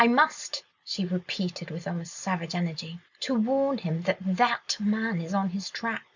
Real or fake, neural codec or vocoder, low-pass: real; none; 7.2 kHz